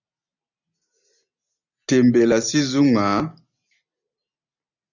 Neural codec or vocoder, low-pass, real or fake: none; 7.2 kHz; real